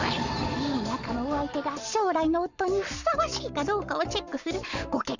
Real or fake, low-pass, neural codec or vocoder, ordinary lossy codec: fake; 7.2 kHz; codec, 44.1 kHz, 7.8 kbps, Pupu-Codec; none